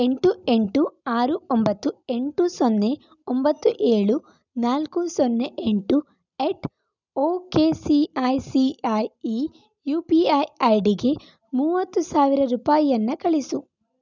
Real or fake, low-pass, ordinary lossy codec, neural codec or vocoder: real; 7.2 kHz; none; none